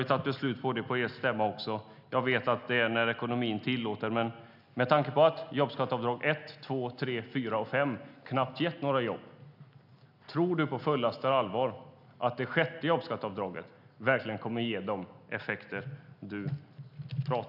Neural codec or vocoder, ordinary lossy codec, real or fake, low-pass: none; none; real; 5.4 kHz